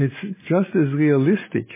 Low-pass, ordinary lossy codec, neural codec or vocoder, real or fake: 3.6 kHz; MP3, 16 kbps; none; real